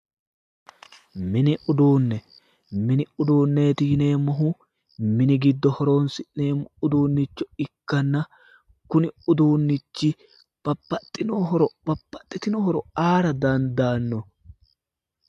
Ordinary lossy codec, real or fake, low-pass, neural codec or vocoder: MP3, 64 kbps; real; 14.4 kHz; none